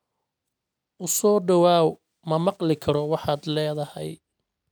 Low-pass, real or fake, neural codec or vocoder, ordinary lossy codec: none; real; none; none